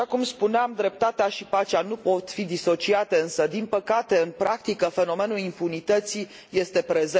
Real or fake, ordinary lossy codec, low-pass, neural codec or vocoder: real; none; none; none